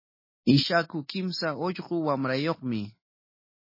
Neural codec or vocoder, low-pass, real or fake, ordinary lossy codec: none; 5.4 kHz; real; MP3, 24 kbps